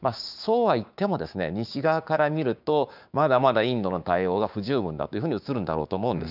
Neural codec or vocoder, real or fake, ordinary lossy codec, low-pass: codec, 16 kHz, 6 kbps, DAC; fake; none; 5.4 kHz